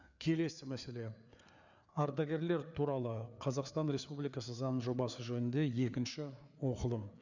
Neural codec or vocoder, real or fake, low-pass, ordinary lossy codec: codec, 16 kHz, 4 kbps, FreqCodec, larger model; fake; 7.2 kHz; none